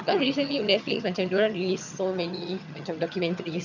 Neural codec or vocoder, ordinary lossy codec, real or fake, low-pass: vocoder, 22.05 kHz, 80 mel bands, HiFi-GAN; none; fake; 7.2 kHz